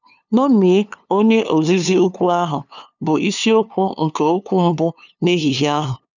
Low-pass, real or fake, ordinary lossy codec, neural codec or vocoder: 7.2 kHz; fake; none; codec, 16 kHz, 2 kbps, FunCodec, trained on LibriTTS, 25 frames a second